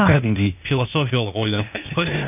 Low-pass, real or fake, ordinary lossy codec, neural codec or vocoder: 3.6 kHz; fake; none; codec, 16 kHz, 0.8 kbps, ZipCodec